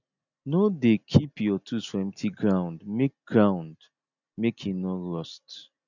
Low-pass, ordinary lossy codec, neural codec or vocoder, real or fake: 7.2 kHz; none; none; real